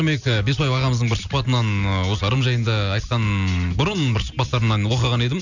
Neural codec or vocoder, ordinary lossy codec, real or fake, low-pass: none; none; real; 7.2 kHz